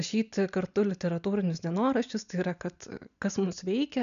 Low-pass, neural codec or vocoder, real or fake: 7.2 kHz; none; real